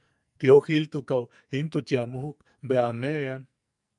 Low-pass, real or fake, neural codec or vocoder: 10.8 kHz; fake; codec, 44.1 kHz, 2.6 kbps, SNAC